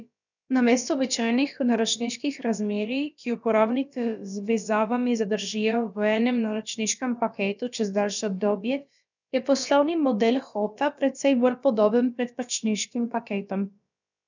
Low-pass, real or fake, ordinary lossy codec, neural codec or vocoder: 7.2 kHz; fake; none; codec, 16 kHz, about 1 kbps, DyCAST, with the encoder's durations